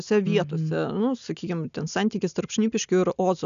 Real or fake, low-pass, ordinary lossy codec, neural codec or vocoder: real; 7.2 kHz; MP3, 96 kbps; none